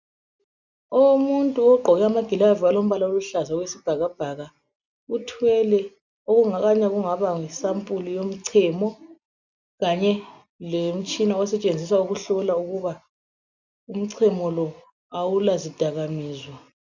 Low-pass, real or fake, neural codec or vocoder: 7.2 kHz; real; none